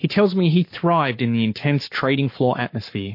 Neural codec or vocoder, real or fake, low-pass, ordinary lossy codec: codec, 44.1 kHz, 7.8 kbps, Pupu-Codec; fake; 5.4 kHz; MP3, 32 kbps